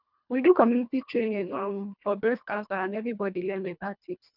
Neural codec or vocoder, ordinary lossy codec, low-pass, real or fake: codec, 24 kHz, 1.5 kbps, HILCodec; none; 5.4 kHz; fake